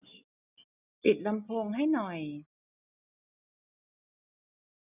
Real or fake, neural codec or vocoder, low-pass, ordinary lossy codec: real; none; 3.6 kHz; none